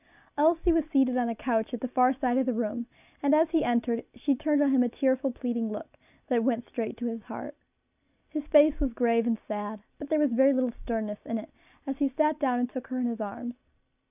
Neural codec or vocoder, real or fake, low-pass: none; real; 3.6 kHz